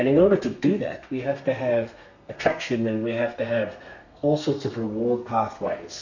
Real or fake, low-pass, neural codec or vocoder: fake; 7.2 kHz; codec, 32 kHz, 1.9 kbps, SNAC